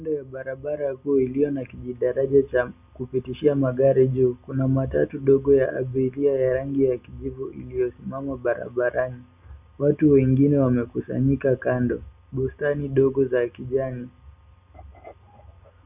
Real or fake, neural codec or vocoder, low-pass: real; none; 3.6 kHz